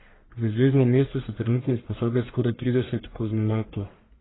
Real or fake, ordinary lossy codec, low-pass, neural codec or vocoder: fake; AAC, 16 kbps; 7.2 kHz; codec, 44.1 kHz, 1.7 kbps, Pupu-Codec